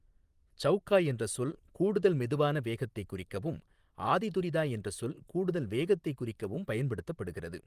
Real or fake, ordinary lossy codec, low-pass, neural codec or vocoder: fake; Opus, 32 kbps; 14.4 kHz; vocoder, 44.1 kHz, 128 mel bands, Pupu-Vocoder